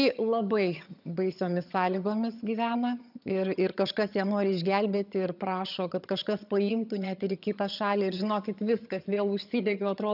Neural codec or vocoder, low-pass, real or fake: vocoder, 22.05 kHz, 80 mel bands, HiFi-GAN; 5.4 kHz; fake